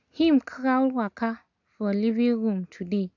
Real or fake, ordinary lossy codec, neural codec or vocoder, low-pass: real; none; none; 7.2 kHz